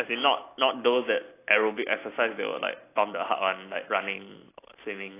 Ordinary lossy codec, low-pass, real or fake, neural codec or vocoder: AAC, 24 kbps; 3.6 kHz; real; none